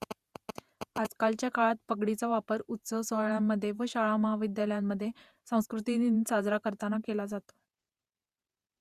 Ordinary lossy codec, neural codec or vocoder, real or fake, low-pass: Opus, 64 kbps; vocoder, 48 kHz, 128 mel bands, Vocos; fake; 14.4 kHz